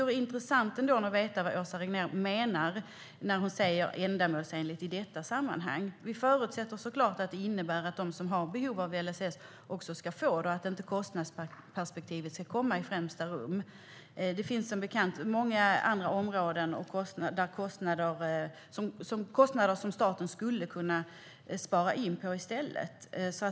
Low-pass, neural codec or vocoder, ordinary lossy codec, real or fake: none; none; none; real